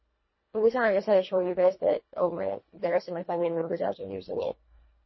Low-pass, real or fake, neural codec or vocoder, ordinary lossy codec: 7.2 kHz; fake; codec, 24 kHz, 1.5 kbps, HILCodec; MP3, 24 kbps